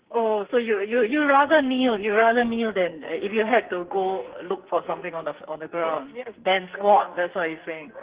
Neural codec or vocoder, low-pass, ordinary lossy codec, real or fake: codec, 44.1 kHz, 2.6 kbps, SNAC; 3.6 kHz; Opus, 16 kbps; fake